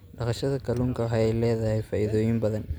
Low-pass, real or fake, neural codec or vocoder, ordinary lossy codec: none; real; none; none